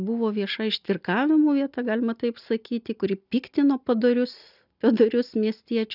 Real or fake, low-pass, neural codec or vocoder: real; 5.4 kHz; none